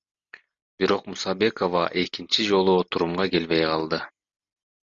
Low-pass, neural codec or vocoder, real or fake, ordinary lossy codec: 7.2 kHz; none; real; MP3, 64 kbps